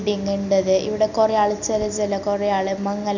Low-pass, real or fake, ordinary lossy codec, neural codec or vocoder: 7.2 kHz; real; none; none